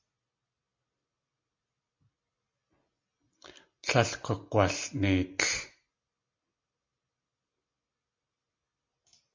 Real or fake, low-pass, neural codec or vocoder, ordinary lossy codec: real; 7.2 kHz; none; MP3, 48 kbps